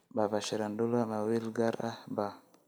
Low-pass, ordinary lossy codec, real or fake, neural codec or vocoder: none; none; real; none